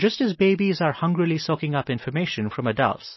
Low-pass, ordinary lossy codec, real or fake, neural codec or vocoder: 7.2 kHz; MP3, 24 kbps; real; none